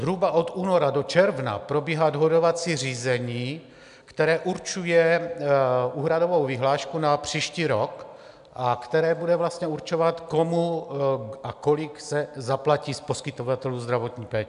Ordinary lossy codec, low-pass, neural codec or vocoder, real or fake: MP3, 96 kbps; 10.8 kHz; none; real